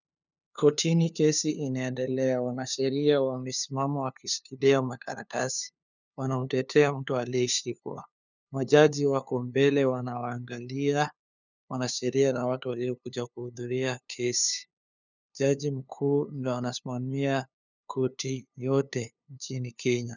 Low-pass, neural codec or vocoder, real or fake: 7.2 kHz; codec, 16 kHz, 2 kbps, FunCodec, trained on LibriTTS, 25 frames a second; fake